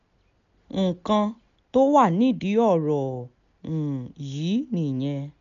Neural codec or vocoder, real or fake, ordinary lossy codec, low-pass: none; real; none; 7.2 kHz